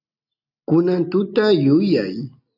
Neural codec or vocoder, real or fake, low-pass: none; real; 5.4 kHz